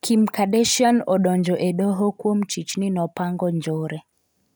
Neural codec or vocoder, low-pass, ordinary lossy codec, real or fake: none; none; none; real